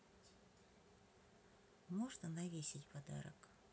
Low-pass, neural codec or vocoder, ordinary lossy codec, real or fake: none; none; none; real